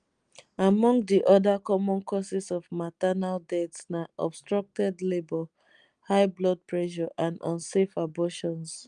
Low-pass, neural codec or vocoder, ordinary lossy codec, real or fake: 9.9 kHz; none; Opus, 32 kbps; real